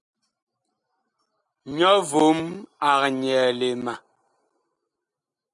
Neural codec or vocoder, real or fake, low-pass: none; real; 10.8 kHz